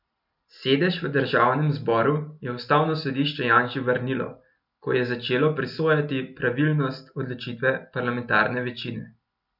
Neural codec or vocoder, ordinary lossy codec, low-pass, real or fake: none; none; 5.4 kHz; real